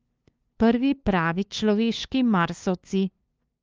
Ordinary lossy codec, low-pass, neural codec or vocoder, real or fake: Opus, 24 kbps; 7.2 kHz; codec, 16 kHz, 2 kbps, FunCodec, trained on LibriTTS, 25 frames a second; fake